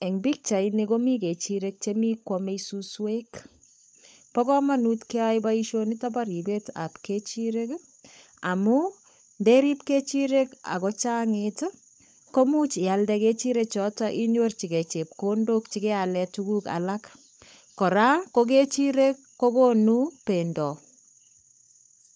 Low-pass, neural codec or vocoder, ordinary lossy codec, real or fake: none; codec, 16 kHz, 16 kbps, FunCodec, trained on LibriTTS, 50 frames a second; none; fake